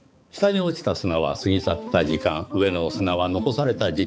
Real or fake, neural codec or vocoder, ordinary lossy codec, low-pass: fake; codec, 16 kHz, 4 kbps, X-Codec, HuBERT features, trained on balanced general audio; none; none